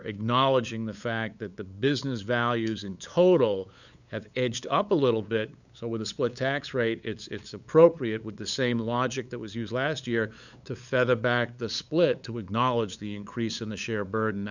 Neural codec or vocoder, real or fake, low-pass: codec, 16 kHz, 8 kbps, FunCodec, trained on LibriTTS, 25 frames a second; fake; 7.2 kHz